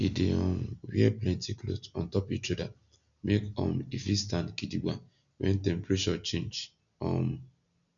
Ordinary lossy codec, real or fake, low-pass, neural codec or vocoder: none; real; 7.2 kHz; none